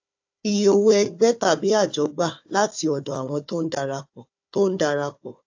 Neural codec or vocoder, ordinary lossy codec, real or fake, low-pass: codec, 16 kHz, 4 kbps, FunCodec, trained on Chinese and English, 50 frames a second; AAC, 48 kbps; fake; 7.2 kHz